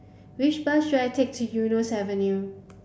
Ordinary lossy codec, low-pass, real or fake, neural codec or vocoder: none; none; real; none